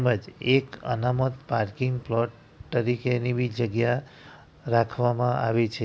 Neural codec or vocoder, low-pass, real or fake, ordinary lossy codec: none; none; real; none